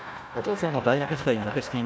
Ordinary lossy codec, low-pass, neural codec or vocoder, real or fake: none; none; codec, 16 kHz, 1 kbps, FunCodec, trained on Chinese and English, 50 frames a second; fake